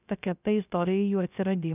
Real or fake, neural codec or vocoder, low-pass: fake; codec, 16 kHz, 0.3 kbps, FocalCodec; 3.6 kHz